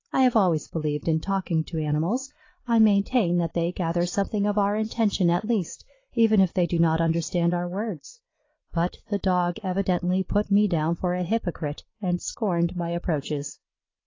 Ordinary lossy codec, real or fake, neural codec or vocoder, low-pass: AAC, 32 kbps; real; none; 7.2 kHz